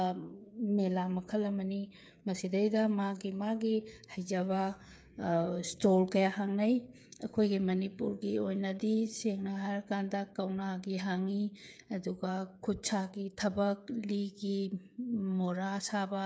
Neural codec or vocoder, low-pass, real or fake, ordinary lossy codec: codec, 16 kHz, 8 kbps, FreqCodec, smaller model; none; fake; none